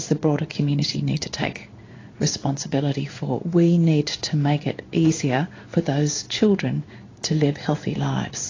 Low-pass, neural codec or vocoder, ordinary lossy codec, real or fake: 7.2 kHz; codec, 16 kHz in and 24 kHz out, 1 kbps, XY-Tokenizer; AAC, 32 kbps; fake